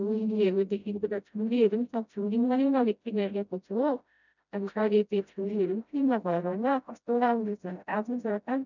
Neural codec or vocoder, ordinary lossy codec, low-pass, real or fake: codec, 16 kHz, 0.5 kbps, FreqCodec, smaller model; none; 7.2 kHz; fake